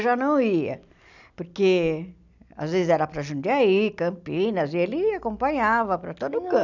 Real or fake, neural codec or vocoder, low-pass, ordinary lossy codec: real; none; 7.2 kHz; none